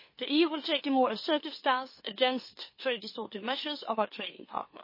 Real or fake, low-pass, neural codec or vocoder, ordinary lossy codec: fake; 5.4 kHz; autoencoder, 44.1 kHz, a latent of 192 numbers a frame, MeloTTS; MP3, 24 kbps